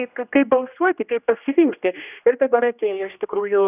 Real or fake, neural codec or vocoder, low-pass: fake; codec, 16 kHz, 1 kbps, X-Codec, HuBERT features, trained on general audio; 3.6 kHz